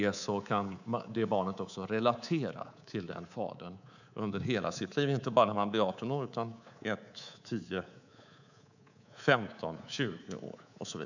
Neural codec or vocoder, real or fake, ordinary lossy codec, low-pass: codec, 24 kHz, 3.1 kbps, DualCodec; fake; none; 7.2 kHz